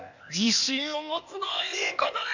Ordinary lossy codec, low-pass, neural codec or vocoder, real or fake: none; 7.2 kHz; codec, 16 kHz, 0.8 kbps, ZipCodec; fake